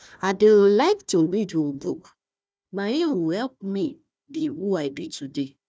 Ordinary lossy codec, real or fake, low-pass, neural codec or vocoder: none; fake; none; codec, 16 kHz, 1 kbps, FunCodec, trained on Chinese and English, 50 frames a second